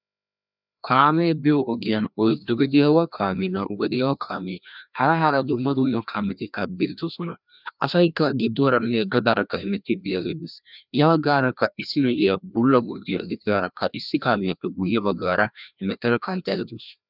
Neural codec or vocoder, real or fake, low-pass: codec, 16 kHz, 1 kbps, FreqCodec, larger model; fake; 5.4 kHz